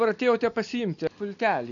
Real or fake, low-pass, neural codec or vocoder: real; 7.2 kHz; none